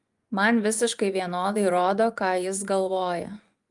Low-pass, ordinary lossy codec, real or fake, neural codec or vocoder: 10.8 kHz; Opus, 32 kbps; fake; vocoder, 24 kHz, 100 mel bands, Vocos